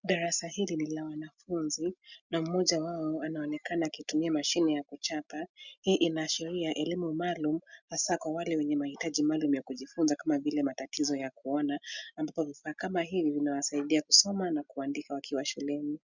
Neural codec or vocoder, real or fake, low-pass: none; real; 7.2 kHz